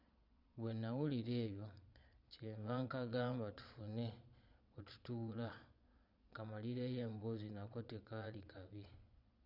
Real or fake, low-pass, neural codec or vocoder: fake; 5.4 kHz; vocoder, 22.05 kHz, 80 mel bands, Vocos